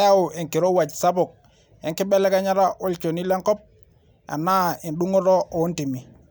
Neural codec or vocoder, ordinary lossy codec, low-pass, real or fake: none; none; none; real